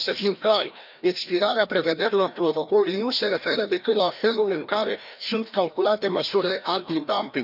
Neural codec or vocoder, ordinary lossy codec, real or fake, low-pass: codec, 16 kHz, 1 kbps, FreqCodec, larger model; none; fake; 5.4 kHz